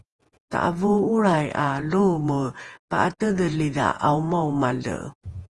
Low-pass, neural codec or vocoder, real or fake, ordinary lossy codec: 10.8 kHz; vocoder, 48 kHz, 128 mel bands, Vocos; fake; Opus, 24 kbps